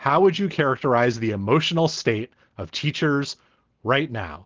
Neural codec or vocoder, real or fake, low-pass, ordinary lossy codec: none; real; 7.2 kHz; Opus, 16 kbps